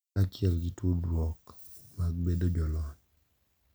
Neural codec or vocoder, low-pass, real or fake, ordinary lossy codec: none; none; real; none